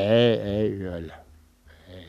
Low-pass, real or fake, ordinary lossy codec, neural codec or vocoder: 14.4 kHz; real; none; none